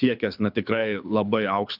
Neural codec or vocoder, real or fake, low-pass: codec, 24 kHz, 6 kbps, HILCodec; fake; 5.4 kHz